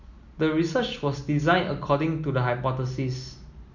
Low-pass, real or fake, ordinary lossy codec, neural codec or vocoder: 7.2 kHz; real; none; none